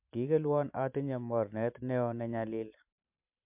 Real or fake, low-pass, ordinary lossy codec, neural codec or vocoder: fake; 3.6 kHz; MP3, 32 kbps; vocoder, 44.1 kHz, 128 mel bands every 512 samples, BigVGAN v2